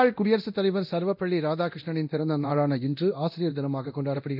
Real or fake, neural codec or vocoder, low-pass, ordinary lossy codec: fake; codec, 24 kHz, 0.9 kbps, DualCodec; 5.4 kHz; none